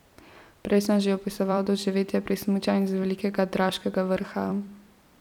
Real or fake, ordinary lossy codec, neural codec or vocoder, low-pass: fake; none; vocoder, 44.1 kHz, 128 mel bands every 256 samples, BigVGAN v2; 19.8 kHz